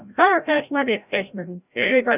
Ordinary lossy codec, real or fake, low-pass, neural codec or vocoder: none; fake; 3.6 kHz; codec, 16 kHz, 0.5 kbps, FreqCodec, larger model